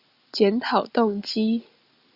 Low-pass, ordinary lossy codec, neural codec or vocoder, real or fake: 5.4 kHz; Opus, 64 kbps; none; real